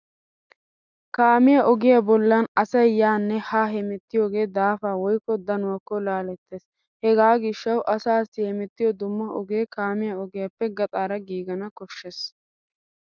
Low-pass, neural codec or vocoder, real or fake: 7.2 kHz; none; real